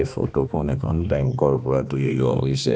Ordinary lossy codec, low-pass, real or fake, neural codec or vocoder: none; none; fake; codec, 16 kHz, 2 kbps, X-Codec, HuBERT features, trained on balanced general audio